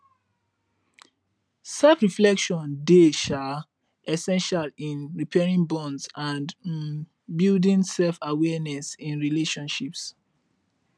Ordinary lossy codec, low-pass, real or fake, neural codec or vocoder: none; none; real; none